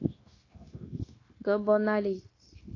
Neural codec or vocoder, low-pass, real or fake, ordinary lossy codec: codec, 16 kHz, 0.9 kbps, LongCat-Audio-Codec; 7.2 kHz; fake; AAC, 32 kbps